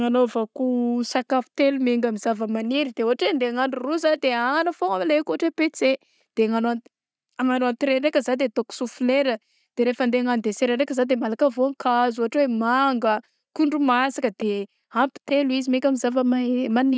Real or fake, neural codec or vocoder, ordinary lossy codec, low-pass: real; none; none; none